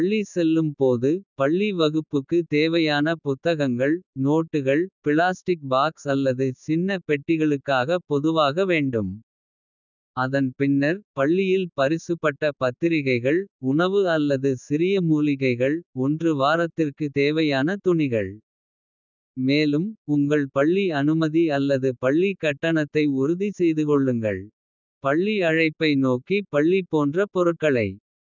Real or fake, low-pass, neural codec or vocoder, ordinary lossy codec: real; 7.2 kHz; none; none